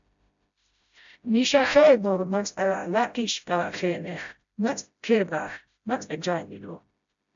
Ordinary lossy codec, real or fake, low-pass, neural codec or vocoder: AAC, 64 kbps; fake; 7.2 kHz; codec, 16 kHz, 0.5 kbps, FreqCodec, smaller model